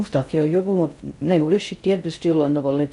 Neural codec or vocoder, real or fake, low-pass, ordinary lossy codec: codec, 16 kHz in and 24 kHz out, 0.6 kbps, FocalCodec, streaming, 4096 codes; fake; 10.8 kHz; none